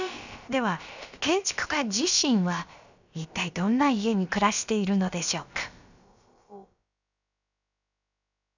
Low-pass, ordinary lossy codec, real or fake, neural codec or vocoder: 7.2 kHz; none; fake; codec, 16 kHz, about 1 kbps, DyCAST, with the encoder's durations